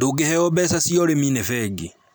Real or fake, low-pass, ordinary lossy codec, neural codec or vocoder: real; none; none; none